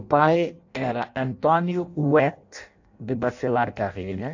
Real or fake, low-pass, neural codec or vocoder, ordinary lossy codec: fake; 7.2 kHz; codec, 16 kHz in and 24 kHz out, 0.6 kbps, FireRedTTS-2 codec; AAC, 48 kbps